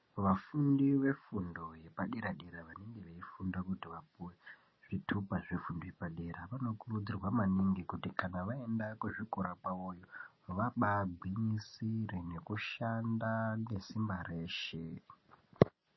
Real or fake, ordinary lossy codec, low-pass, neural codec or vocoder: real; MP3, 24 kbps; 7.2 kHz; none